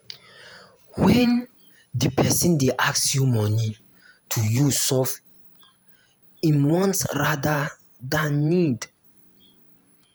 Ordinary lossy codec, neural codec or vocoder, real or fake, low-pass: none; vocoder, 48 kHz, 128 mel bands, Vocos; fake; none